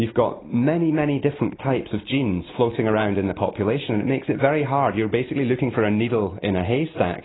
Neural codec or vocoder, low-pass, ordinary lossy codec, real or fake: none; 7.2 kHz; AAC, 16 kbps; real